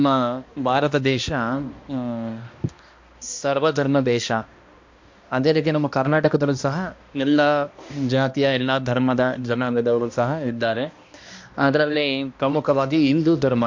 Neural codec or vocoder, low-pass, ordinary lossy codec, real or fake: codec, 16 kHz, 1 kbps, X-Codec, HuBERT features, trained on balanced general audio; 7.2 kHz; MP3, 48 kbps; fake